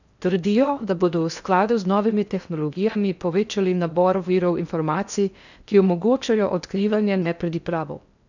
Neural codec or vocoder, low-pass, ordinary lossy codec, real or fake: codec, 16 kHz in and 24 kHz out, 0.6 kbps, FocalCodec, streaming, 4096 codes; 7.2 kHz; none; fake